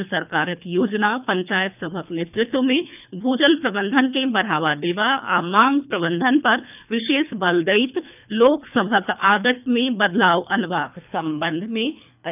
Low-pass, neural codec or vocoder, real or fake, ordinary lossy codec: 3.6 kHz; codec, 24 kHz, 3 kbps, HILCodec; fake; none